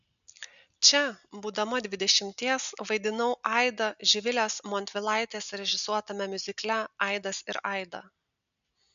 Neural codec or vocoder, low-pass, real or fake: none; 7.2 kHz; real